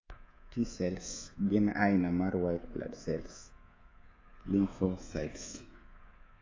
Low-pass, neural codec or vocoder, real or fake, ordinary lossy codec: 7.2 kHz; codec, 24 kHz, 3.1 kbps, DualCodec; fake; AAC, 32 kbps